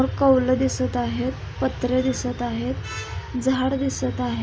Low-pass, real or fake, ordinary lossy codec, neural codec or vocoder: none; real; none; none